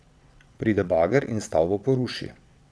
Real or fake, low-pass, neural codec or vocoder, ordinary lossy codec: fake; none; vocoder, 22.05 kHz, 80 mel bands, WaveNeXt; none